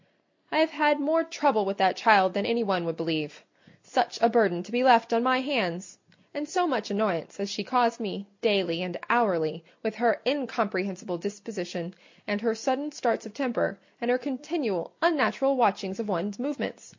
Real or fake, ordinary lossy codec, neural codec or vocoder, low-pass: real; MP3, 48 kbps; none; 7.2 kHz